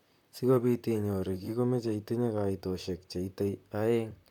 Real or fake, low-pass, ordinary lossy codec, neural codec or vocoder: fake; 19.8 kHz; none; vocoder, 44.1 kHz, 128 mel bands, Pupu-Vocoder